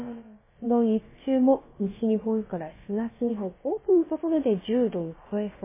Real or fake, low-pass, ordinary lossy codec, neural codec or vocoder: fake; 3.6 kHz; MP3, 16 kbps; codec, 16 kHz, about 1 kbps, DyCAST, with the encoder's durations